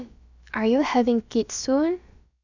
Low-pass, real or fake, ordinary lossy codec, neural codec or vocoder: 7.2 kHz; fake; none; codec, 16 kHz, about 1 kbps, DyCAST, with the encoder's durations